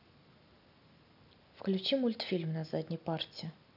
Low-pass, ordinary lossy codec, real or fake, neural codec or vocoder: 5.4 kHz; none; real; none